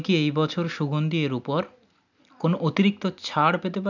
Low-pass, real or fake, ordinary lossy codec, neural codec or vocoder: 7.2 kHz; real; none; none